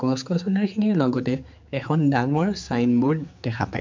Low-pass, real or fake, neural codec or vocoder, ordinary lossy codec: 7.2 kHz; fake; codec, 16 kHz, 4 kbps, X-Codec, HuBERT features, trained on general audio; MP3, 64 kbps